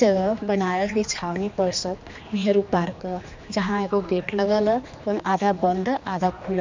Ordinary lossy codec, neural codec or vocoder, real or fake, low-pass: MP3, 64 kbps; codec, 16 kHz, 2 kbps, X-Codec, HuBERT features, trained on general audio; fake; 7.2 kHz